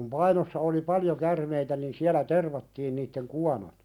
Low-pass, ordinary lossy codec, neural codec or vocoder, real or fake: 19.8 kHz; none; none; real